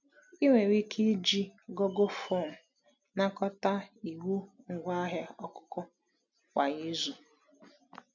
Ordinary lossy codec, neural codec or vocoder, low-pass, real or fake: none; none; 7.2 kHz; real